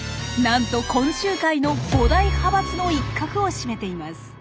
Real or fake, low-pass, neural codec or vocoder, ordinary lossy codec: real; none; none; none